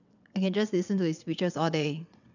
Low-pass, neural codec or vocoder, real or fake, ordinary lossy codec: 7.2 kHz; vocoder, 22.05 kHz, 80 mel bands, WaveNeXt; fake; none